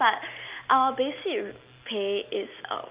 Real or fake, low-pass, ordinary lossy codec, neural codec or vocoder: real; 3.6 kHz; Opus, 24 kbps; none